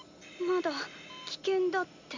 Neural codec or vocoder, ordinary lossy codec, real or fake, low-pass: none; MP3, 48 kbps; real; 7.2 kHz